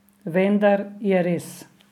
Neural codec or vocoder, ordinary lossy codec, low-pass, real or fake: none; none; 19.8 kHz; real